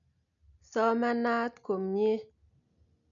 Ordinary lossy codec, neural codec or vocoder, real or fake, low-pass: none; none; real; 7.2 kHz